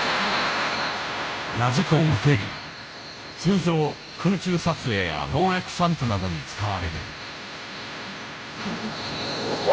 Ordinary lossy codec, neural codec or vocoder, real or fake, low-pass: none; codec, 16 kHz, 0.5 kbps, FunCodec, trained on Chinese and English, 25 frames a second; fake; none